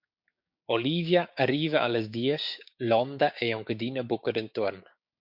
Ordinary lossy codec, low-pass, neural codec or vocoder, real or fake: MP3, 48 kbps; 5.4 kHz; codec, 16 kHz, 6 kbps, DAC; fake